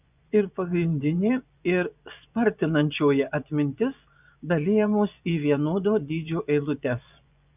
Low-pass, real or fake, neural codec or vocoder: 3.6 kHz; fake; vocoder, 24 kHz, 100 mel bands, Vocos